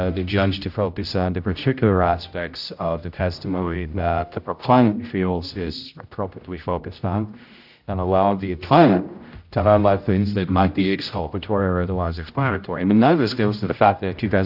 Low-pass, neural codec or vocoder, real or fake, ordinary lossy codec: 5.4 kHz; codec, 16 kHz, 0.5 kbps, X-Codec, HuBERT features, trained on general audio; fake; AAC, 48 kbps